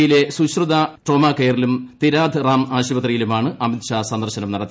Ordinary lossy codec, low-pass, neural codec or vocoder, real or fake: none; none; none; real